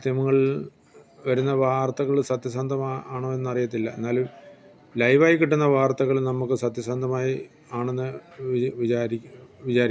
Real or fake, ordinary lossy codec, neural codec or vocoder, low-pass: real; none; none; none